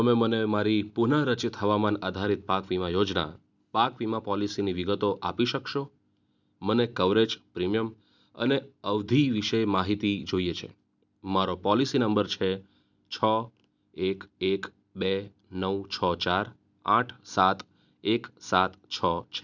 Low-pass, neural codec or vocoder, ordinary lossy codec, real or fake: 7.2 kHz; none; none; real